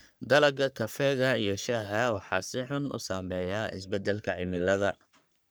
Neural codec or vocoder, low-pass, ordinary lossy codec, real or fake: codec, 44.1 kHz, 3.4 kbps, Pupu-Codec; none; none; fake